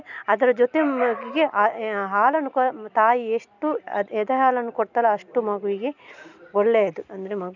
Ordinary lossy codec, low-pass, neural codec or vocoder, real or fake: none; 7.2 kHz; none; real